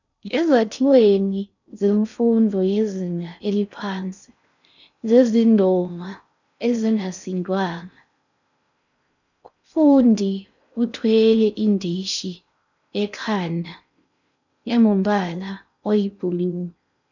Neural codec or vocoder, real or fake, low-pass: codec, 16 kHz in and 24 kHz out, 0.6 kbps, FocalCodec, streaming, 4096 codes; fake; 7.2 kHz